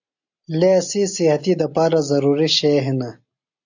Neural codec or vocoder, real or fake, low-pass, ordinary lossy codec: none; real; 7.2 kHz; AAC, 48 kbps